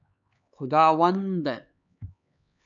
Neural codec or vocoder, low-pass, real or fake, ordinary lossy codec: codec, 16 kHz, 4 kbps, X-Codec, HuBERT features, trained on LibriSpeech; 7.2 kHz; fake; MP3, 96 kbps